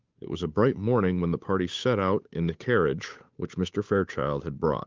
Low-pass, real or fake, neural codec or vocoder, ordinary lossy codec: 7.2 kHz; fake; codec, 16 kHz, 8 kbps, FunCodec, trained on Chinese and English, 25 frames a second; Opus, 24 kbps